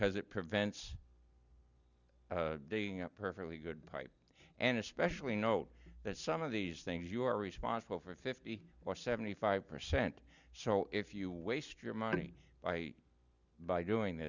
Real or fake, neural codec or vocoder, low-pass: real; none; 7.2 kHz